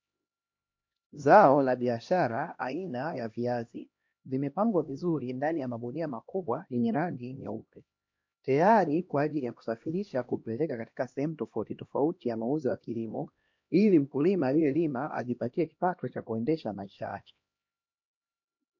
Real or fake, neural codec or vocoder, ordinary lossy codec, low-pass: fake; codec, 16 kHz, 1 kbps, X-Codec, HuBERT features, trained on LibriSpeech; MP3, 48 kbps; 7.2 kHz